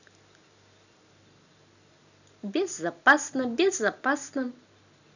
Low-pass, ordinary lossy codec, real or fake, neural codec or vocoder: 7.2 kHz; none; real; none